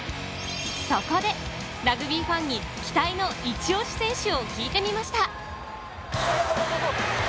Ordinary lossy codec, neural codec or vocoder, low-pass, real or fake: none; none; none; real